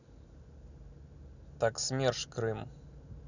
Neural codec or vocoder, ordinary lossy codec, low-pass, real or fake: none; none; 7.2 kHz; real